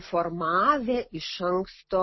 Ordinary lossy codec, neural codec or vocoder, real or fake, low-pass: MP3, 24 kbps; none; real; 7.2 kHz